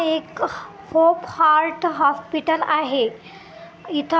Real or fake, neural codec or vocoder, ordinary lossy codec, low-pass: real; none; none; none